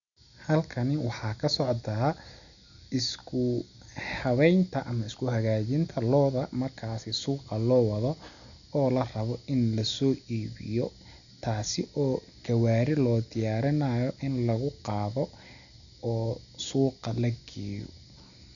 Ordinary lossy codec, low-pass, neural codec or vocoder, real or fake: none; 7.2 kHz; none; real